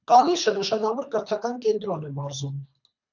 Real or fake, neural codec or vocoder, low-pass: fake; codec, 24 kHz, 3 kbps, HILCodec; 7.2 kHz